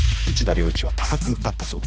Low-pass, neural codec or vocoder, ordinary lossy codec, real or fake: none; codec, 16 kHz, 2 kbps, X-Codec, HuBERT features, trained on balanced general audio; none; fake